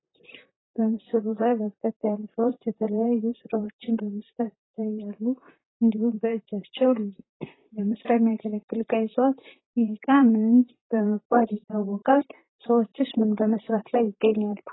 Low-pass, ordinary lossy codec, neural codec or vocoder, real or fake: 7.2 kHz; AAC, 16 kbps; vocoder, 44.1 kHz, 128 mel bands, Pupu-Vocoder; fake